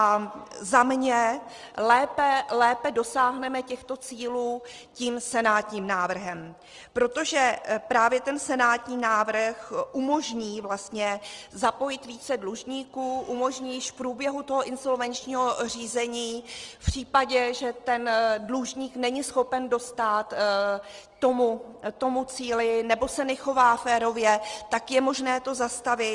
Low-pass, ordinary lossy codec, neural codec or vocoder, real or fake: 10.8 kHz; Opus, 24 kbps; none; real